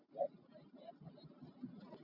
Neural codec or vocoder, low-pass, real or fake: none; 5.4 kHz; real